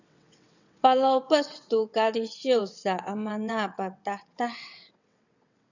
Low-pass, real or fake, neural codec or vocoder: 7.2 kHz; fake; vocoder, 22.05 kHz, 80 mel bands, WaveNeXt